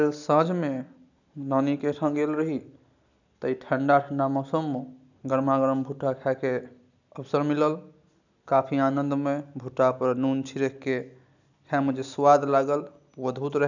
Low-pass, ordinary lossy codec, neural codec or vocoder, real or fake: 7.2 kHz; none; none; real